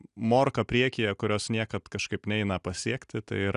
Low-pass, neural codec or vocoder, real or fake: 10.8 kHz; none; real